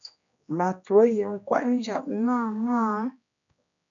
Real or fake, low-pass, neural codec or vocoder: fake; 7.2 kHz; codec, 16 kHz, 1 kbps, X-Codec, HuBERT features, trained on general audio